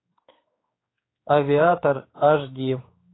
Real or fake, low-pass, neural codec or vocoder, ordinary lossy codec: fake; 7.2 kHz; codec, 16 kHz, 4 kbps, X-Codec, HuBERT features, trained on general audio; AAC, 16 kbps